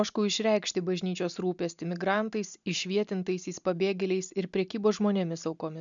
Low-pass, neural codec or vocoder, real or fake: 7.2 kHz; none; real